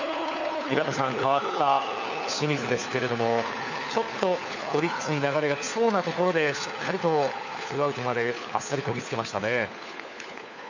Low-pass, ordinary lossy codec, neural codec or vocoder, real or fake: 7.2 kHz; none; codec, 16 kHz, 4 kbps, FunCodec, trained on LibriTTS, 50 frames a second; fake